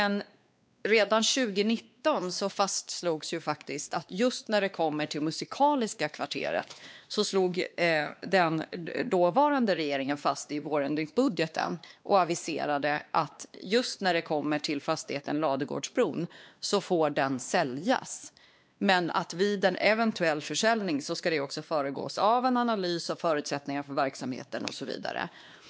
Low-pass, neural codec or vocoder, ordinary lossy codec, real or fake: none; codec, 16 kHz, 2 kbps, X-Codec, WavLM features, trained on Multilingual LibriSpeech; none; fake